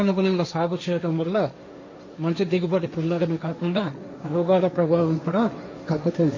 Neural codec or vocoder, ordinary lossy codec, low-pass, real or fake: codec, 16 kHz, 1.1 kbps, Voila-Tokenizer; MP3, 32 kbps; 7.2 kHz; fake